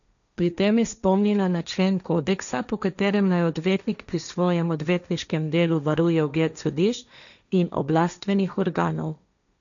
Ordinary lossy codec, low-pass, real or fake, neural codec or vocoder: none; 7.2 kHz; fake; codec, 16 kHz, 1.1 kbps, Voila-Tokenizer